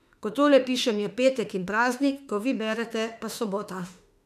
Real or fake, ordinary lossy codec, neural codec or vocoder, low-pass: fake; none; autoencoder, 48 kHz, 32 numbers a frame, DAC-VAE, trained on Japanese speech; 14.4 kHz